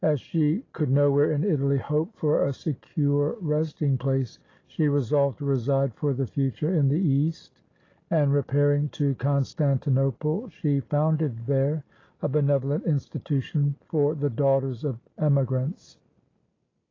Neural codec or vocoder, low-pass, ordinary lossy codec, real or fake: none; 7.2 kHz; AAC, 32 kbps; real